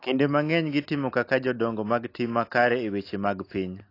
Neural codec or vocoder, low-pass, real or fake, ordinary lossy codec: none; 5.4 kHz; real; AAC, 32 kbps